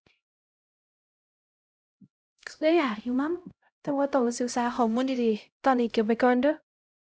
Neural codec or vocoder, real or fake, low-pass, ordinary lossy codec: codec, 16 kHz, 0.5 kbps, X-Codec, HuBERT features, trained on LibriSpeech; fake; none; none